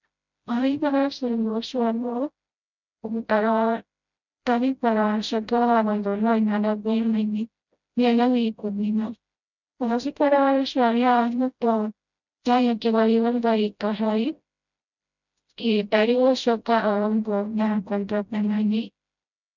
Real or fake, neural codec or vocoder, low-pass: fake; codec, 16 kHz, 0.5 kbps, FreqCodec, smaller model; 7.2 kHz